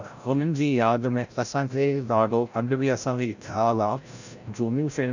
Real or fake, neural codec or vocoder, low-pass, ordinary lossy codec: fake; codec, 16 kHz, 0.5 kbps, FreqCodec, larger model; 7.2 kHz; none